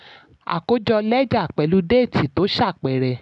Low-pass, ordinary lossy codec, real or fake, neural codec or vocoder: 10.8 kHz; none; real; none